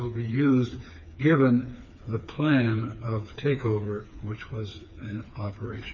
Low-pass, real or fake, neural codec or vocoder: 7.2 kHz; fake; codec, 16 kHz, 4 kbps, FreqCodec, smaller model